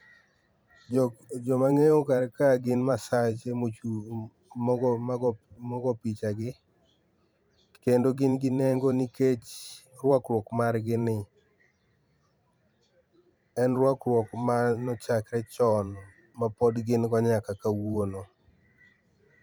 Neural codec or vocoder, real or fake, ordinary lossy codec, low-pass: vocoder, 44.1 kHz, 128 mel bands every 512 samples, BigVGAN v2; fake; none; none